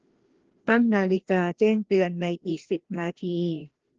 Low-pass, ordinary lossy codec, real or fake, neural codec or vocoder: 7.2 kHz; Opus, 16 kbps; fake; codec, 16 kHz, 1 kbps, FreqCodec, larger model